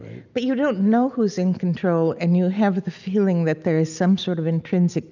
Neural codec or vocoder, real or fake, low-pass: none; real; 7.2 kHz